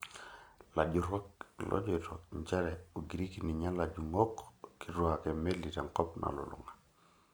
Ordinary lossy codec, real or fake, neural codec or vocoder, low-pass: none; real; none; none